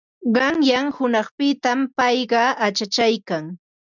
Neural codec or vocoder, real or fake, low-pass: none; real; 7.2 kHz